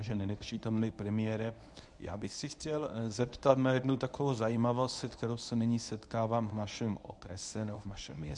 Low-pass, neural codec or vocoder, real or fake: 10.8 kHz; codec, 24 kHz, 0.9 kbps, WavTokenizer, medium speech release version 1; fake